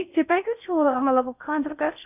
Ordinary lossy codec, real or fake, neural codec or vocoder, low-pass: none; fake; codec, 16 kHz in and 24 kHz out, 0.6 kbps, FocalCodec, streaming, 4096 codes; 3.6 kHz